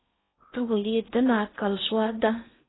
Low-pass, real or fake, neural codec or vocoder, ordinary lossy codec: 7.2 kHz; fake; codec, 16 kHz in and 24 kHz out, 0.6 kbps, FocalCodec, streaming, 4096 codes; AAC, 16 kbps